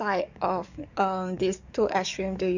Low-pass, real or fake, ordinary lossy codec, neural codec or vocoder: 7.2 kHz; fake; none; codec, 44.1 kHz, 7.8 kbps, DAC